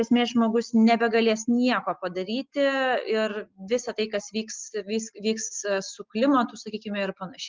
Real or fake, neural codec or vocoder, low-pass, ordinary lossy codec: real; none; 7.2 kHz; Opus, 32 kbps